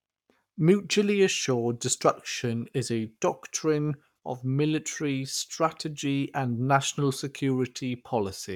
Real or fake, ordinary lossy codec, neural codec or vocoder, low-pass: fake; none; codec, 44.1 kHz, 7.8 kbps, Pupu-Codec; 19.8 kHz